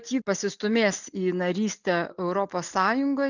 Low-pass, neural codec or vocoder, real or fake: 7.2 kHz; none; real